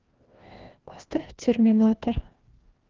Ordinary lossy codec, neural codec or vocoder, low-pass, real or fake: Opus, 16 kbps; codec, 16 kHz, 1 kbps, FreqCodec, larger model; 7.2 kHz; fake